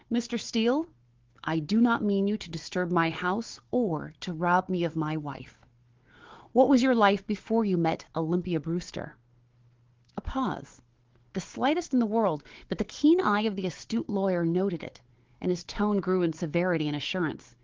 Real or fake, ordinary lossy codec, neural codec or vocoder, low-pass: fake; Opus, 16 kbps; codec, 16 kHz, 4 kbps, FunCodec, trained on Chinese and English, 50 frames a second; 7.2 kHz